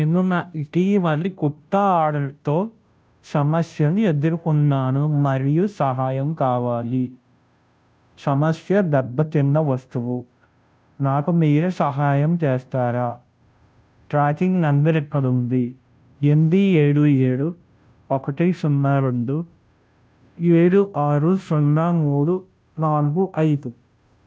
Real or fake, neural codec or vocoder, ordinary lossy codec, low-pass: fake; codec, 16 kHz, 0.5 kbps, FunCodec, trained on Chinese and English, 25 frames a second; none; none